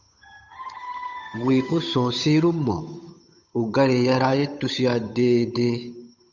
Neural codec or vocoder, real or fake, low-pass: codec, 16 kHz, 8 kbps, FunCodec, trained on Chinese and English, 25 frames a second; fake; 7.2 kHz